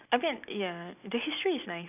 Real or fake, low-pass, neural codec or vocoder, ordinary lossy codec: real; 3.6 kHz; none; none